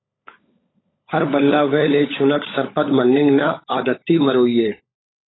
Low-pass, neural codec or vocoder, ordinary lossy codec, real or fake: 7.2 kHz; codec, 16 kHz, 16 kbps, FunCodec, trained on LibriTTS, 50 frames a second; AAC, 16 kbps; fake